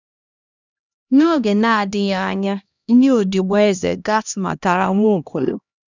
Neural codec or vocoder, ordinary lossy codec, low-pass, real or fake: codec, 16 kHz, 1 kbps, X-Codec, HuBERT features, trained on LibriSpeech; none; 7.2 kHz; fake